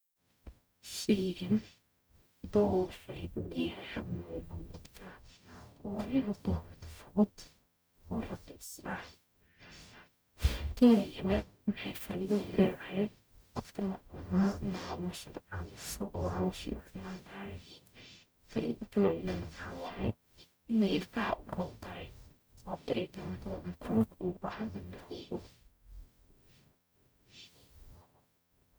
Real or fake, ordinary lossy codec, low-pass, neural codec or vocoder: fake; none; none; codec, 44.1 kHz, 0.9 kbps, DAC